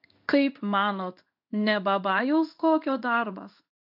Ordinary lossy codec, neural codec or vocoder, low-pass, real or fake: AAC, 48 kbps; codec, 16 kHz in and 24 kHz out, 1 kbps, XY-Tokenizer; 5.4 kHz; fake